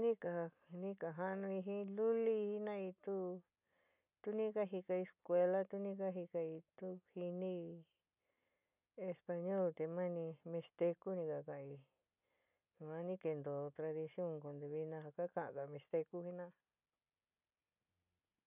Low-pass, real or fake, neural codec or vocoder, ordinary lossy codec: 3.6 kHz; real; none; none